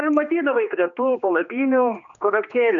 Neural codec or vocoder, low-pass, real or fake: codec, 16 kHz, 2 kbps, X-Codec, HuBERT features, trained on general audio; 7.2 kHz; fake